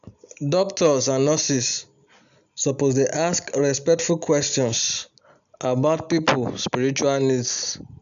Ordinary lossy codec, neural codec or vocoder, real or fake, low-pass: none; none; real; 7.2 kHz